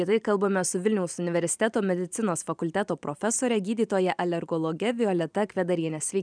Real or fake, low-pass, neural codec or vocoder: fake; 9.9 kHz; vocoder, 44.1 kHz, 128 mel bands every 512 samples, BigVGAN v2